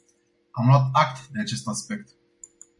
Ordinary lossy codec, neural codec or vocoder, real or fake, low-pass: MP3, 64 kbps; none; real; 10.8 kHz